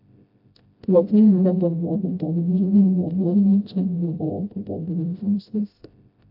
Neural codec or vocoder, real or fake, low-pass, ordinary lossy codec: codec, 16 kHz, 0.5 kbps, FreqCodec, smaller model; fake; 5.4 kHz; AAC, 48 kbps